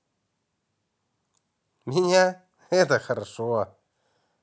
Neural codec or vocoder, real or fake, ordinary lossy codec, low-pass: none; real; none; none